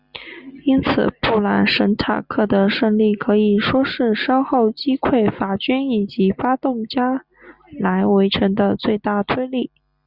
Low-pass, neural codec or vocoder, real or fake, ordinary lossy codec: 5.4 kHz; none; real; Opus, 64 kbps